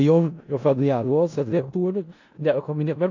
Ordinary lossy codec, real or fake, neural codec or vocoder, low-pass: AAC, 48 kbps; fake; codec, 16 kHz in and 24 kHz out, 0.4 kbps, LongCat-Audio-Codec, four codebook decoder; 7.2 kHz